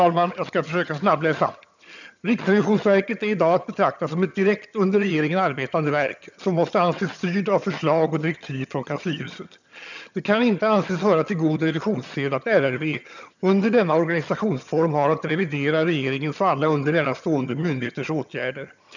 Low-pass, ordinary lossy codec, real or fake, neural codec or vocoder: 7.2 kHz; none; fake; vocoder, 22.05 kHz, 80 mel bands, HiFi-GAN